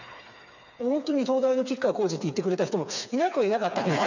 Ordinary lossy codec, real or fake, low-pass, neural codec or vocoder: none; fake; 7.2 kHz; codec, 16 kHz, 4 kbps, FreqCodec, smaller model